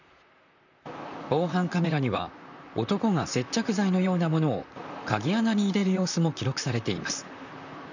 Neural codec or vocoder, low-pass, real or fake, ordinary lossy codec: vocoder, 44.1 kHz, 128 mel bands, Pupu-Vocoder; 7.2 kHz; fake; none